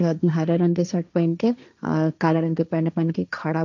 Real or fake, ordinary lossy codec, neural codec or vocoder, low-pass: fake; none; codec, 16 kHz, 1.1 kbps, Voila-Tokenizer; 7.2 kHz